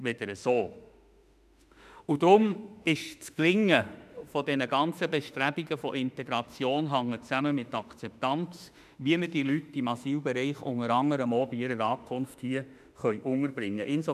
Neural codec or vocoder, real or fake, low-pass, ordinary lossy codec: autoencoder, 48 kHz, 32 numbers a frame, DAC-VAE, trained on Japanese speech; fake; 14.4 kHz; none